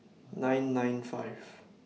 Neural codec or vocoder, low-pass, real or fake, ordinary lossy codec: none; none; real; none